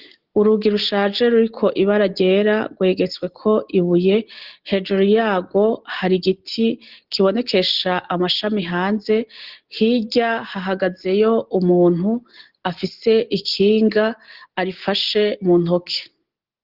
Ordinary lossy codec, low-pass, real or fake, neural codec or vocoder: Opus, 16 kbps; 5.4 kHz; real; none